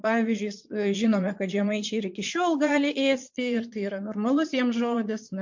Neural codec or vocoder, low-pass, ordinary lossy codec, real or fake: vocoder, 22.05 kHz, 80 mel bands, Vocos; 7.2 kHz; MP3, 48 kbps; fake